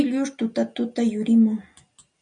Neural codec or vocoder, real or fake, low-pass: none; real; 9.9 kHz